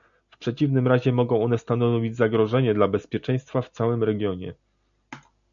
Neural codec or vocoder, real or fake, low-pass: none; real; 7.2 kHz